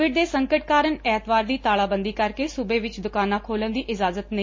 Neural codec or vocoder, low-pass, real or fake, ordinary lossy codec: none; 7.2 kHz; real; MP3, 32 kbps